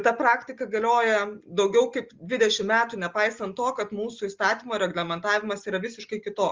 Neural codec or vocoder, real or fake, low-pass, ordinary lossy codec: none; real; 7.2 kHz; Opus, 32 kbps